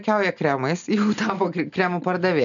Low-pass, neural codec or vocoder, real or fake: 7.2 kHz; none; real